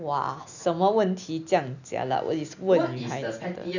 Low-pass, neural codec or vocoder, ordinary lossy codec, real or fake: 7.2 kHz; none; none; real